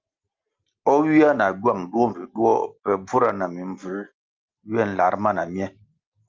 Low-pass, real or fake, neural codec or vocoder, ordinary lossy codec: 7.2 kHz; real; none; Opus, 24 kbps